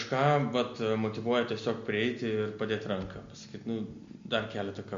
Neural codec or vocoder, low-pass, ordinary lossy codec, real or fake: none; 7.2 kHz; MP3, 48 kbps; real